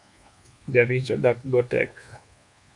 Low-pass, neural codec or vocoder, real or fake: 10.8 kHz; codec, 24 kHz, 1.2 kbps, DualCodec; fake